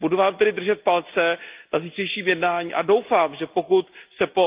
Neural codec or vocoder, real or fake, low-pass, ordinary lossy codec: none; real; 3.6 kHz; Opus, 24 kbps